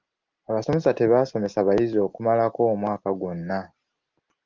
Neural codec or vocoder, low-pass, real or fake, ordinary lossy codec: none; 7.2 kHz; real; Opus, 32 kbps